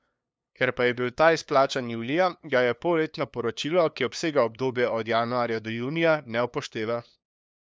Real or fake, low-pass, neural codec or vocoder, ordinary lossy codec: fake; none; codec, 16 kHz, 2 kbps, FunCodec, trained on LibriTTS, 25 frames a second; none